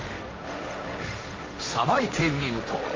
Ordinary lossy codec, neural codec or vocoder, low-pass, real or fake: Opus, 32 kbps; codec, 16 kHz, 1.1 kbps, Voila-Tokenizer; 7.2 kHz; fake